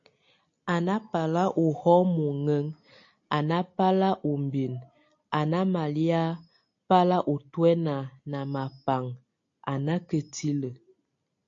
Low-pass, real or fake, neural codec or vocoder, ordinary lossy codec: 7.2 kHz; real; none; AAC, 48 kbps